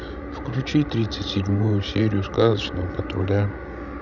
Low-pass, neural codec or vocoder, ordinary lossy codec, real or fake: 7.2 kHz; codec, 16 kHz, 16 kbps, FreqCodec, larger model; none; fake